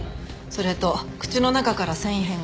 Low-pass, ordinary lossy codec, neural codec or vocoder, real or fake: none; none; none; real